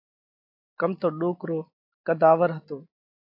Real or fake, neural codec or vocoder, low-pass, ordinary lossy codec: real; none; 5.4 kHz; AAC, 48 kbps